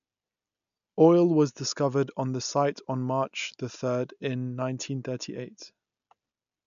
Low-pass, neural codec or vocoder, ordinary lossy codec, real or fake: 7.2 kHz; none; none; real